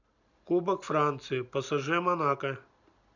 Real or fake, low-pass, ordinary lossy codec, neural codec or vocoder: real; 7.2 kHz; none; none